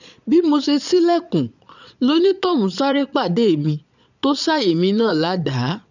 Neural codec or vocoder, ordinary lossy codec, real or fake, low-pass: vocoder, 22.05 kHz, 80 mel bands, Vocos; none; fake; 7.2 kHz